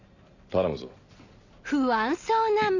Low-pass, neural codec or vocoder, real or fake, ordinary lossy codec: 7.2 kHz; none; real; none